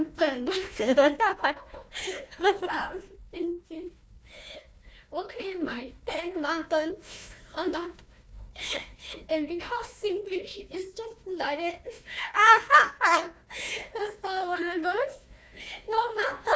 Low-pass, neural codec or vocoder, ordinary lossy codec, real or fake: none; codec, 16 kHz, 1 kbps, FunCodec, trained on Chinese and English, 50 frames a second; none; fake